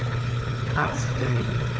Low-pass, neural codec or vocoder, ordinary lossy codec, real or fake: none; codec, 16 kHz, 4 kbps, FunCodec, trained on Chinese and English, 50 frames a second; none; fake